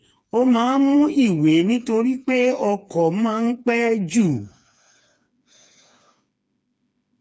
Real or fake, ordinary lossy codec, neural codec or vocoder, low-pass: fake; none; codec, 16 kHz, 4 kbps, FreqCodec, smaller model; none